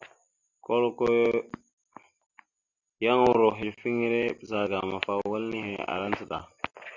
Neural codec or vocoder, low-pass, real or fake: none; 7.2 kHz; real